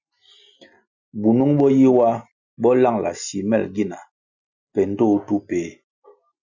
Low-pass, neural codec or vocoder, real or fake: 7.2 kHz; none; real